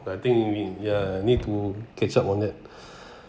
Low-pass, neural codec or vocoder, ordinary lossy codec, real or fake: none; none; none; real